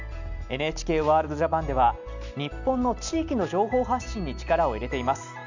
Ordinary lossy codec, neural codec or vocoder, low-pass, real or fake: none; none; 7.2 kHz; real